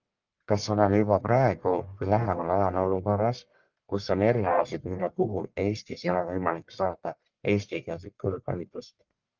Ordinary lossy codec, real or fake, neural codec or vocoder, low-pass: Opus, 24 kbps; fake; codec, 44.1 kHz, 1.7 kbps, Pupu-Codec; 7.2 kHz